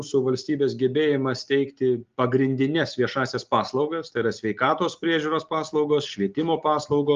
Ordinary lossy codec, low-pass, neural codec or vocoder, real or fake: Opus, 32 kbps; 7.2 kHz; none; real